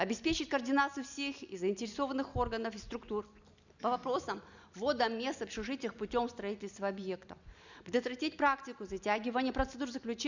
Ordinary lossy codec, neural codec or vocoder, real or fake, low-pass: none; none; real; 7.2 kHz